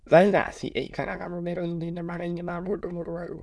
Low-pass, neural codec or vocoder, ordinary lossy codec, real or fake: none; autoencoder, 22.05 kHz, a latent of 192 numbers a frame, VITS, trained on many speakers; none; fake